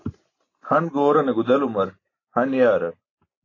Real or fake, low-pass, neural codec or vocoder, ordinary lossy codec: real; 7.2 kHz; none; AAC, 32 kbps